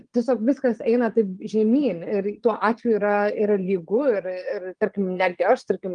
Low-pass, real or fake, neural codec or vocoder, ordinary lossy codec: 9.9 kHz; fake; vocoder, 22.05 kHz, 80 mel bands, Vocos; Opus, 24 kbps